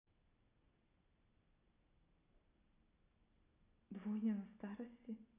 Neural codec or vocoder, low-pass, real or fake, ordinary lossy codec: none; 3.6 kHz; real; none